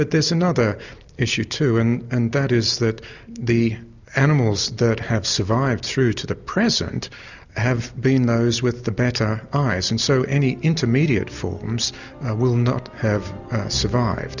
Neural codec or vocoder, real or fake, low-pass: none; real; 7.2 kHz